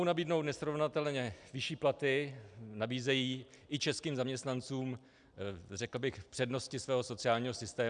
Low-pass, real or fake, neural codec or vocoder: 9.9 kHz; real; none